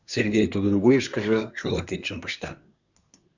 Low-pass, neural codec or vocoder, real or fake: 7.2 kHz; codec, 24 kHz, 1 kbps, SNAC; fake